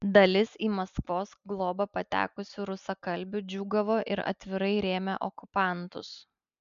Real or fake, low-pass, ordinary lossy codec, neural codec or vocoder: real; 7.2 kHz; MP3, 64 kbps; none